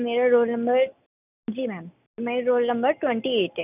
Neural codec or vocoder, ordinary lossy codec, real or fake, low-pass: none; none; real; 3.6 kHz